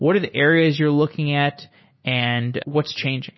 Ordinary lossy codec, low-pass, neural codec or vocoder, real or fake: MP3, 24 kbps; 7.2 kHz; none; real